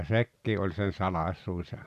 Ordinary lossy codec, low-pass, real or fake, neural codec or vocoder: none; 14.4 kHz; real; none